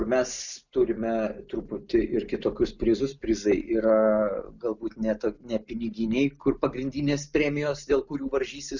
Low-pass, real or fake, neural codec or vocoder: 7.2 kHz; real; none